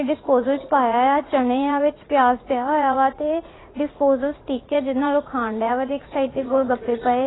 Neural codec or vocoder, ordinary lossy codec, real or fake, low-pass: vocoder, 44.1 kHz, 80 mel bands, Vocos; AAC, 16 kbps; fake; 7.2 kHz